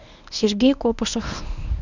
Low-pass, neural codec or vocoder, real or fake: 7.2 kHz; codec, 24 kHz, 0.9 kbps, WavTokenizer, medium speech release version 1; fake